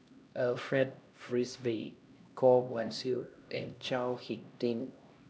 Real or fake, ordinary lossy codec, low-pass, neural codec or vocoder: fake; none; none; codec, 16 kHz, 1 kbps, X-Codec, HuBERT features, trained on LibriSpeech